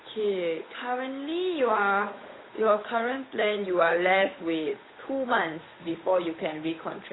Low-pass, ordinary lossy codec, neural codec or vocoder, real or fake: 7.2 kHz; AAC, 16 kbps; codec, 16 kHz, 8 kbps, FunCodec, trained on Chinese and English, 25 frames a second; fake